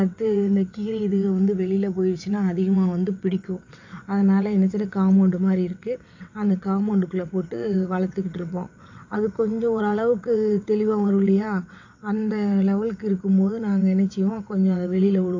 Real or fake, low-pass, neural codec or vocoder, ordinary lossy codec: fake; 7.2 kHz; vocoder, 22.05 kHz, 80 mel bands, WaveNeXt; AAC, 48 kbps